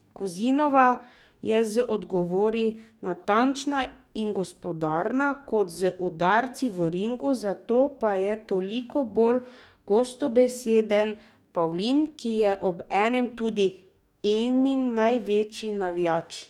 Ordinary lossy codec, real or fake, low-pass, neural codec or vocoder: none; fake; 19.8 kHz; codec, 44.1 kHz, 2.6 kbps, DAC